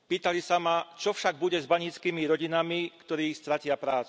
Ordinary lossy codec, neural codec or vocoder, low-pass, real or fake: none; none; none; real